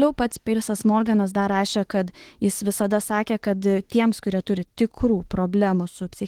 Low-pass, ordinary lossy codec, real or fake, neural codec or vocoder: 19.8 kHz; Opus, 16 kbps; fake; autoencoder, 48 kHz, 32 numbers a frame, DAC-VAE, trained on Japanese speech